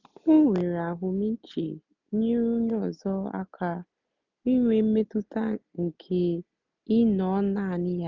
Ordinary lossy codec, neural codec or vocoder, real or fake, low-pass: none; none; real; 7.2 kHz